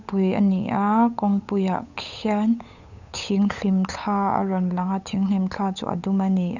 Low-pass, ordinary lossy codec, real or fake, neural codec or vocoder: 7.2 kHz; none; fake; codec, 16 kHz, 8 kbps, FunCodec, trained on Chinese and English, 25 frames a second